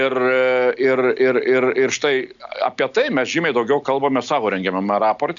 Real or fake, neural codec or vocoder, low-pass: real; none; 7.2 kHz